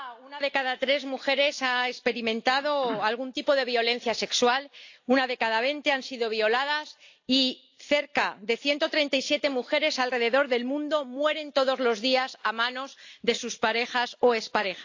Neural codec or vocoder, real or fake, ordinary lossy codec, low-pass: none; real; AAC, 48 kbps; 7.2 kHz